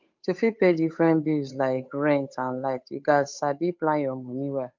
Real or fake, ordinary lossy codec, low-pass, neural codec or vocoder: fake; MP3, 48 kbps; 7.2 kHz; codec, 16 kHz, 8 kbps, FunCodec, trained on Chinese and English, 25 frames a second